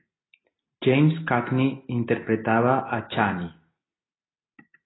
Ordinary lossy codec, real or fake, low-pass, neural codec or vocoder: AAC, 16 kbps; real; 7.2 kHz; none